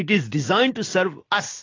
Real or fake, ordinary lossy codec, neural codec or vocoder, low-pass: real; AAC, 32 kbps; none; 7.2 kHz